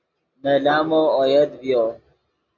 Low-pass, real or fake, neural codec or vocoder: 7.2 kHz; real; none